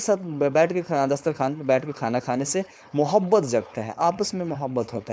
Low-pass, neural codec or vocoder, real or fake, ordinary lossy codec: none; codec, 16 kHz, 4.8 kbps, FACodec; fake; none